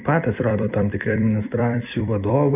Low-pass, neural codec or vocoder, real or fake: 3.6 kHz; vocoder, 44.1 kHz, 128 mel bands every 256 samples, BigVGAN v2; fake